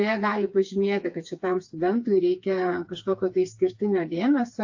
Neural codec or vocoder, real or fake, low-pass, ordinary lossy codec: codec, 16 kHz, 4 kbps, FreqCodec, smaller model; fake; 7.2 kHz; AAC, 48 kbps